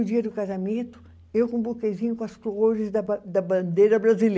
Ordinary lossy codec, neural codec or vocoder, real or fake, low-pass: none; none; real; none